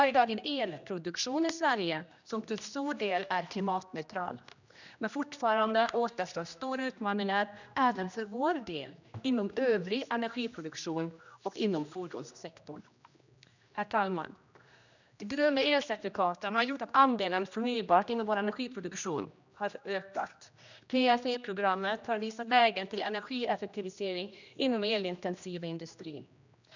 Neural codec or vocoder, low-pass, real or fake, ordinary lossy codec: codec, 16 kHz, 1 kbps, X-Codec, HuBERT features, trained on general audio; 7.2 kHz; fake; none